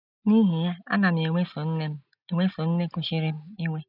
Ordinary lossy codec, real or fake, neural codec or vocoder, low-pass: none; real; none; 5.4 kHz